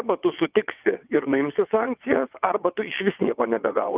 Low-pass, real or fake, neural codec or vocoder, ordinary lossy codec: 3.6 kHz; fake; vocoder, 22.05 kHz, 80 mel bands, WaveNeXt; Opus, 32 kbps